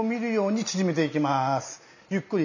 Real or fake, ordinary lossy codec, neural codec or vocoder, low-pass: real; none; none; 7.2 kHz